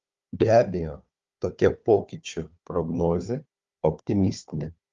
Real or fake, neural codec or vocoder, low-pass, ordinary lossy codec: fake; codec, 16 kHz, 4 kbps, FunCodec, trained on Chinese and English, 50 frames a second; 7.2 kHz; Opus, 32 kbps